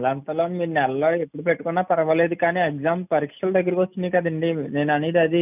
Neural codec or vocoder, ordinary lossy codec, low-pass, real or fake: none; none; 3.6 kHz; real